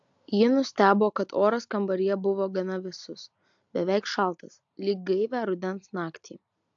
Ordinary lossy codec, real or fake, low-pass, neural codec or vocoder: AAC, 64 kbps; real; 7.2 kHz; none